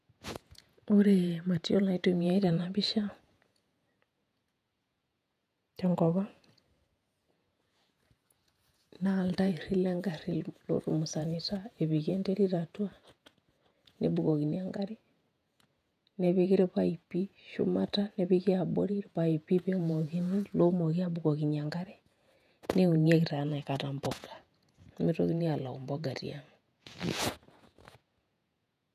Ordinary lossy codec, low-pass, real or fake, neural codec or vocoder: none; 14.4 kHz; fake; vocoder, 48 kHz, 128 mel bands, Vocos